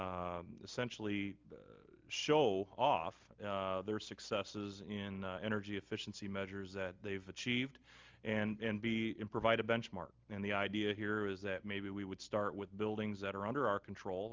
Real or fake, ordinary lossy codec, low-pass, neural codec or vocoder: real; Opus, 32 kbps; 7.2 kHz; none